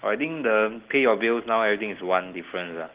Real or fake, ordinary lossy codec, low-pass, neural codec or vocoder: real; Opus, 16 kbps; 3.6 kHz; none